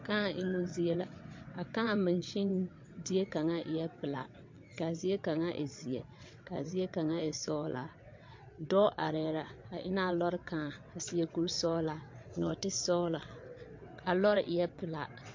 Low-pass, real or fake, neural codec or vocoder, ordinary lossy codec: 7.2 kHz; fake; vocoder, 22.05 kHz, 80 mel bands, WaveNeXt; MP3, 48 kbps